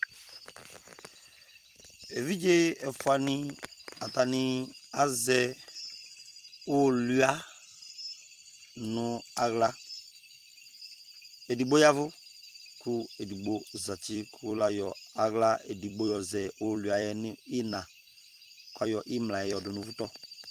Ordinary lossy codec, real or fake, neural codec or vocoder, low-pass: Opus, 24 kbps; fake; vocoder, 44.1 kHz, 128 mel bands every 256 samples, BigVGAN v2; 14.4 kHz